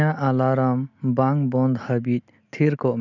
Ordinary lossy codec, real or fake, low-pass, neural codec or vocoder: none; real; 7.2 kHz; none